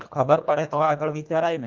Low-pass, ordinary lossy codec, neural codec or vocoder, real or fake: 7.2 kHz; Opus, 24 kbps; codec, 24 kHz, 1.5 kbps, HILCodec; fake